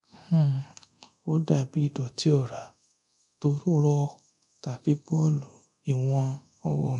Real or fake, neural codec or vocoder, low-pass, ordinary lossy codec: fake; codec, 24 kHz, 0.9 kbps, DualCodec; 10.8 kHz; none